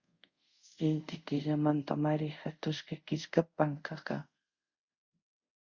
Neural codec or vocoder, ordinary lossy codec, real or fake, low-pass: codec, 24 kHz, 0.5 kbps, DualCodec; Opus, 64 kbps; fake; 7.2 kHz